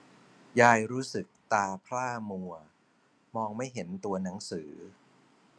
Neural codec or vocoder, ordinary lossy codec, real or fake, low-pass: none; none; real; none